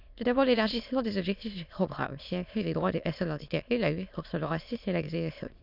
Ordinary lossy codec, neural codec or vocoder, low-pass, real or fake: none; autoencoder, 22.05 kHz, a latent of 192 numbers a frame, VITS, trained on many speakers; 5.4 kHz; fake